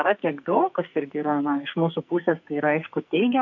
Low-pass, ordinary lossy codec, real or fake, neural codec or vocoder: 7.2 kHz; MP3, 48 kbps; fake; codec, 44.1 kHz, 2.6 kbps, SNAC